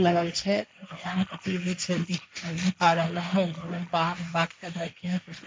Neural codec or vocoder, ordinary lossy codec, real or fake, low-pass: codec, 16 kHz, 1.1 kbps, Voila-Tokenizer; none; fake; none